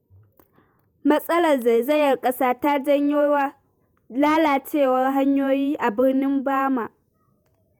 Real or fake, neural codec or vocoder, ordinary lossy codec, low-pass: fake; vocoder, 48 kHz, 128 mel bands, Vocos; none; none